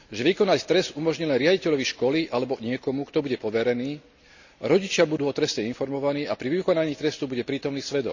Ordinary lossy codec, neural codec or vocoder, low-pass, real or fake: none; none; 7.2 kHz; real